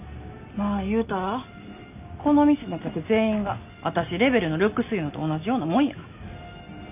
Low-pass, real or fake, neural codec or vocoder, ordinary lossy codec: 3.6 kHz; real; none; none